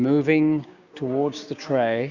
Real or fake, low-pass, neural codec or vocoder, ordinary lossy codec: fake; 7.2 kHz; codec, 16 kHz, 6 kbps, DAC; Opus, 64 kbps